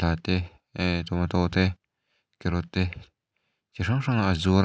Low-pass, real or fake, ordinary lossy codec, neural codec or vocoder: none; real; none; none